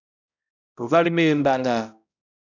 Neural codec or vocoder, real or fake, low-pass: codec, 16 kHz, 0.5 kbps, X-Codec, HuBERT features, trained on balanced general audio; fake; 7.2 kHz